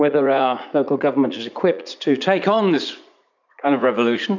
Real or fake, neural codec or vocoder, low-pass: fake; vocoder, 44.1 kHz, 80 mel bands, Vocos; 7.2 kHz